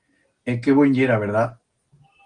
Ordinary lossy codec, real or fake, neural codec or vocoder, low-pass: Opus, 24 kbps; real; none; 9.9 kHz